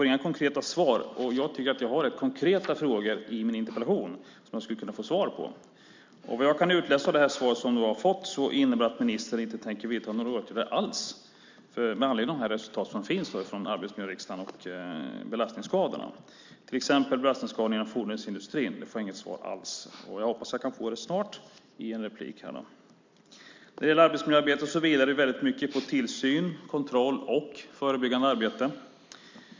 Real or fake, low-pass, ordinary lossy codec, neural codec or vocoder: real; 7.2 kHz; MP3, 64 kbps; none